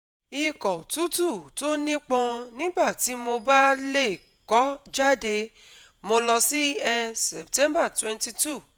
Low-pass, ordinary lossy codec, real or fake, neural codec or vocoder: none; none; fake; vocoder, 48 kHz, 128 mel bands, Vocos